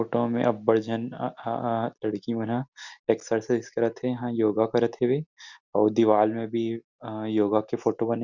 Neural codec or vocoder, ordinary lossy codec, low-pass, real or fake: none; none; 7.2 kHz; real